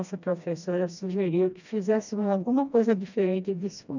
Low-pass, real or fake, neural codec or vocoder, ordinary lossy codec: 7.2 kHz; fake; codec, 16 kHz, 1 kbps, FreqCodec, smaller model; none